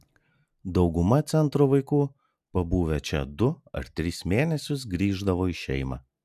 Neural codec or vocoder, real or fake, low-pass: none; real; 14.4 kHz